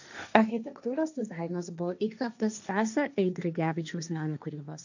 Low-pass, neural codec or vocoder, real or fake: 7.2 kHz; codec, 16 kHz, 1.1 kbps, Voila-Tokenizer; fake